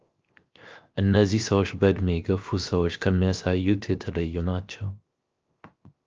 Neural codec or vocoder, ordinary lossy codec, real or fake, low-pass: codec, 16 kHz, 0.7 kbps, FocalCodec; Opus, 24 kbps; fake; 7.2 kHz